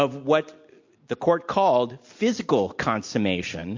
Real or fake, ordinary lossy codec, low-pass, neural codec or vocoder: real; MP3, 48 kbps; 7.2 kHz; none